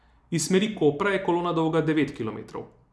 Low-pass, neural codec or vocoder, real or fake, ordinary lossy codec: none; none; real; none